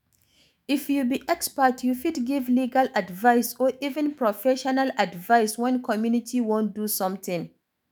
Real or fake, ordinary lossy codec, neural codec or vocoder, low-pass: fake; none; autoencoder, 48 kHz, 128 numbers a frame, DAC-VAE, trained on Japanese speech; none